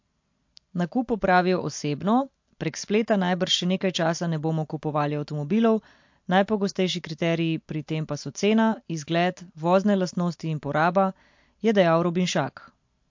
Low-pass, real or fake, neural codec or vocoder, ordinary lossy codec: 7.2 kHz; real; none; MP3, 48 kbps